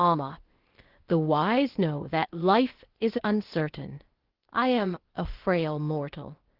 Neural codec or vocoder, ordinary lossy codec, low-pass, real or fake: codec, 16 kHz, 0.8 kbps, ZipCodec; Opus, 16 kbps; 5.4 kHz; fake